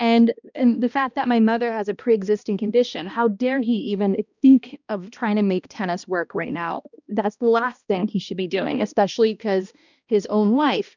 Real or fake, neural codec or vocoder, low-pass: fake; codec, 16 kHz, 1 kbps, X-Codec, HuBERT features, trained on balanced general audio; 7.2 kHz